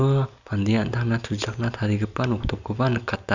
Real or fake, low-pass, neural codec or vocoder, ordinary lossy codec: real; 7.2 kHz; none; none